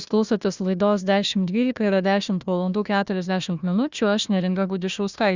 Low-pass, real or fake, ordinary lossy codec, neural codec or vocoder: 7.2 kHz; fake; Opus, 64 kbps; codec, 16 kHz, 1 kbps, FunCodec, trained on Chinese and English, 50 frames a second